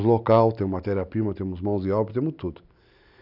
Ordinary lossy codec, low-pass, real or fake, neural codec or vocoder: none; 5.4 kHz; real; none